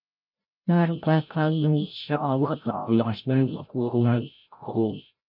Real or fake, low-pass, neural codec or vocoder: fake; 5.4 kHz; codec, 16 kHz, 0.5 kbps, FreqCodec, larger model